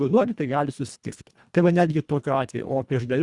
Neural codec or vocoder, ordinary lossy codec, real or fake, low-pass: codec, 24 kHz, 1.5 kbps, HILCodec; Opus, 64 kbps; fake; 10.8 kHz